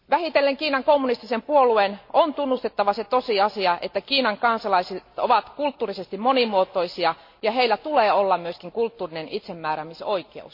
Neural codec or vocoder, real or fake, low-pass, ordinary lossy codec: none; real; 5.4 kHz; MP3, 32 kbps